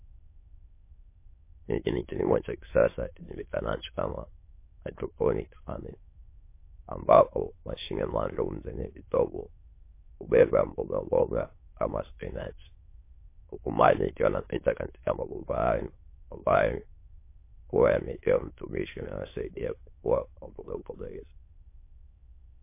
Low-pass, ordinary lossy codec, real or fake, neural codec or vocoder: 3.6 kHz; MP3, 24 kbps; fake; autoencoder, 22.05 kHz, a latent of 192 numbers a frame, VITS, trained on many speakers